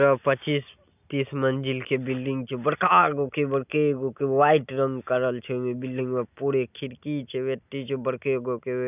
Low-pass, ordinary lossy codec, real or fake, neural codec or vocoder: 3.6 kHz; none; real; none